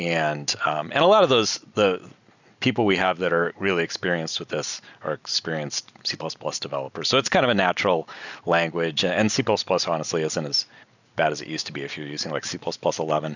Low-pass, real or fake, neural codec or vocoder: 7.2 kHz; real; none